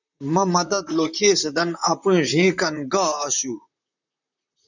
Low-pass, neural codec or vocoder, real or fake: 7.2 kHz; vocoder, 44.1 kHz, 128 mel bands, Pupu-Vocoder; fake